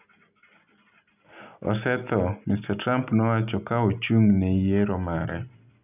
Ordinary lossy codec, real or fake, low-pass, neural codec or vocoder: none; real; 3.6 kHz; none